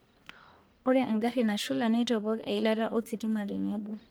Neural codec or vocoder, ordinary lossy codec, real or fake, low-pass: codec, 44.1 kHz, 1.7 kbps, Pupu-Codec; none; fake; none